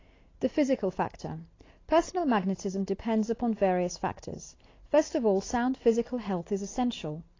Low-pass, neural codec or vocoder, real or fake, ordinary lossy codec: 7.2 kHz; none; real; AAC, 32 kbps